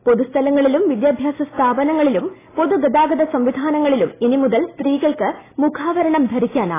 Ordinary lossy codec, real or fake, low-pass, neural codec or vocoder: AAC, 16 kbps; real; 3.6 kHz; none